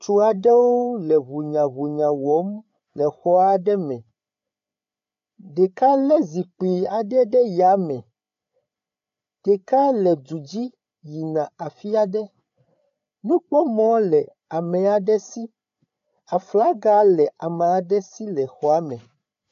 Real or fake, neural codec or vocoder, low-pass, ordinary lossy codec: fake; codec, 16 kHz, 8 kbps, FreqCodec, larger model; 7.2 kHz; AAC, 64 kbps